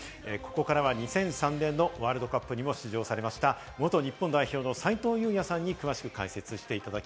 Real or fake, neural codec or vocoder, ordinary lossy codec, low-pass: real; none; none; none